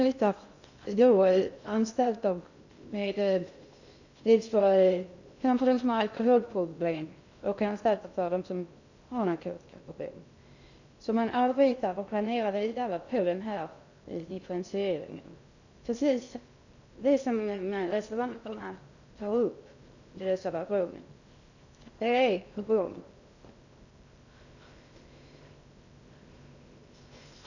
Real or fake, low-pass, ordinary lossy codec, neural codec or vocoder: fake; 7.2 kHz; none; codec, 16 kHz in and 24 kHz out, 0.6 kbps, FocalCodec, streaming, 2048 codes